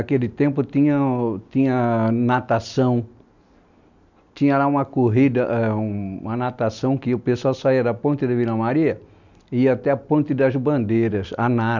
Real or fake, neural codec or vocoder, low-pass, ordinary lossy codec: real; none; 7.2 kHz; none